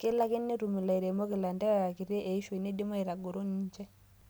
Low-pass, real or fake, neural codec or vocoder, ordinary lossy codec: none; real; none; none